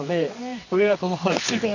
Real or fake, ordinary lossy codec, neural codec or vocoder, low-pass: fake; none; codec, 24 kHz, 0.9 kbps, WavTokenizer, medium music audio release; 7.2 kHz